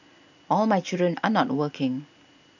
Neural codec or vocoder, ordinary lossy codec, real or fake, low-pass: none; none; real; 7.2 kHz